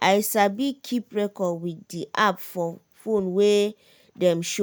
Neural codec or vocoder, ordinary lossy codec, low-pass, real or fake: none; none; none; real